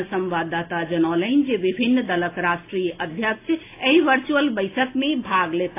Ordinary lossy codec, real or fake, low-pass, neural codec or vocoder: AAC, 24 kbps; real; 3.6 kHz; none